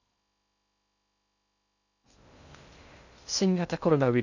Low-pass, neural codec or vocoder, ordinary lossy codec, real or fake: 7.2 kHz; codec, 16 kHz in and 24 kHz out, 0.6 kbps, FocalCodec, streaming, 2048 codes; none; fake